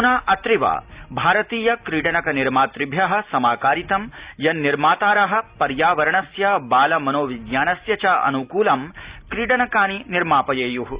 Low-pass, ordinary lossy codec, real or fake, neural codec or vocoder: 3.6 kHz; Opus, 64 kbps; real; none